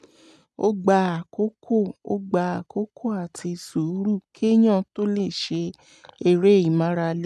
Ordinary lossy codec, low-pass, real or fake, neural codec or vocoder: none; none; real; none